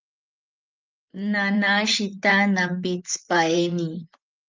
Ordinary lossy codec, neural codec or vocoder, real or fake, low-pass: Opus, 24 kbps; vocoder, 44.1 kHz, 128 mel bands, Pupu-Vocoder; fake; 7.2 kHz